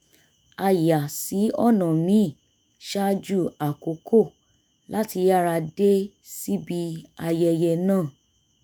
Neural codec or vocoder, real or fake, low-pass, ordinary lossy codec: autoencoder, 48 kHz, 128 numbers a frame, DAC-VAE, trained on Japanese speech; fake; none; none